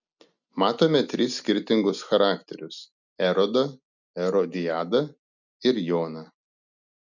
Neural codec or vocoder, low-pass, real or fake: none; 7.2 kHz; real